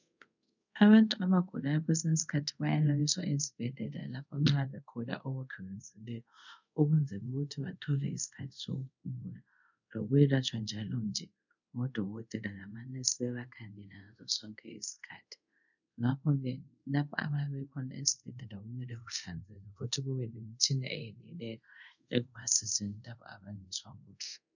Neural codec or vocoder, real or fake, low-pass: codec, 24 kHz, 0.5 kbps, DualCodec; fake; 7.2 kHz